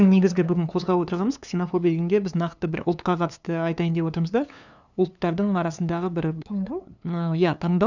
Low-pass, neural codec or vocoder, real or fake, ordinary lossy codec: 7.2 kHz; codec, 16 kHz, 2 kbps, FunCodec, trained on LibriTTS, 25 frames a second; fake; none